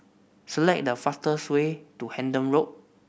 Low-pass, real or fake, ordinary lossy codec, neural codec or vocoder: none; real; none; none